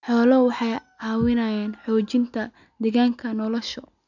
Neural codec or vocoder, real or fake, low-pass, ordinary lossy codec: none; real; 7.2 kHz; none